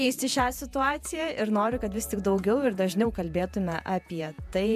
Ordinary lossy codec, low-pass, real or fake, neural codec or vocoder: MP3, 96 kbps; 14.4 kHz; fake; vocoder, 48 kHz, 128 mel bands, Vocos